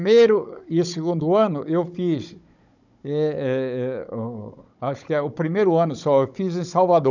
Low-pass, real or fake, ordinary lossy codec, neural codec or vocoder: 7.2 kHz; fake; none; codec, 16 kHz, 16 kbps, FunCodec, trained on Chinese and English, 50 frames a second